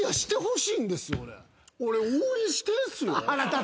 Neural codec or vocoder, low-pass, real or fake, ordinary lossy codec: none; none; real; none